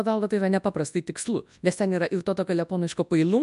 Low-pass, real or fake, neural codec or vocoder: 10.8 kHz; fake; codec, 24 kHz, 0.9 kbps, WavTokenizer, large speech release